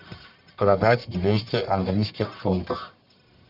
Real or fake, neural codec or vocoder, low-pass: fake; codec, 44.1 kHz, 1.7 kbps, Pupu-Codec; 5.4 kHz